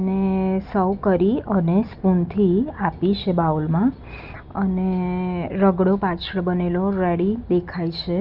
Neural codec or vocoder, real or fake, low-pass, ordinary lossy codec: none; real; 5.4 kHz; Opus, 24 kbps